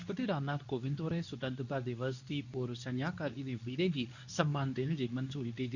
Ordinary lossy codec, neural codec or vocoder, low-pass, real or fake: none; codec, 24 kHz, 0.9 kbps, WavTokenizer, medium speech release version 2; 7.2 kHz; fake